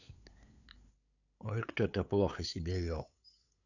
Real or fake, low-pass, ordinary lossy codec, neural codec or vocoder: fake; 7.2 kHz; none; codec, 16 kHz, 8 kbps, FunCodec, trained on LibriTTS, 25 frames a second